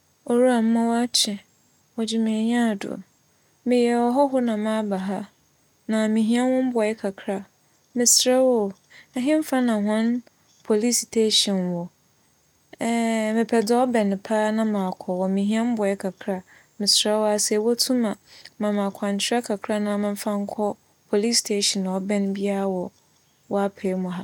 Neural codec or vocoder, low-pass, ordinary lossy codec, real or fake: none; 19.8 kHz; none; real